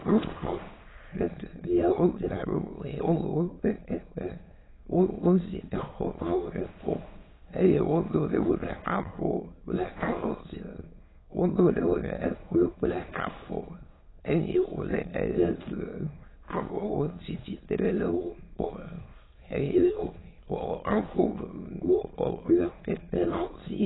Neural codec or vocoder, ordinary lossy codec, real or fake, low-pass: autoencoder, 22.05 kHz, a latent of 192 numbers a frame, VITS, trained on many speakers; AAC, 16 kbps; fake; 7.2 kHz